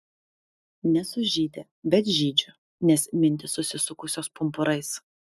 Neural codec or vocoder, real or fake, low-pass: none; real; 14.4 kHz